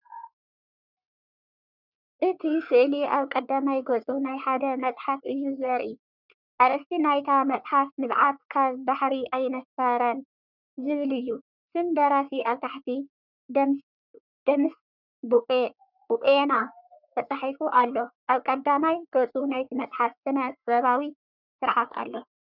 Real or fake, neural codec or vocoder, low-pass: fake; codec, 44.1 kHz, 3.4 kbps, Pupu-Codec; 5.4 kHz